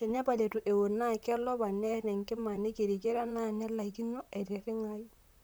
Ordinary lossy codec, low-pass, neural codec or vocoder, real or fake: none; none; vocoder, 44.1 kHz, 128 mel bands, Pupu-Vocoder; fake